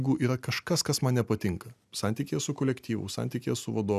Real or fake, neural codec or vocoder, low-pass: real; none; 14.4 kHz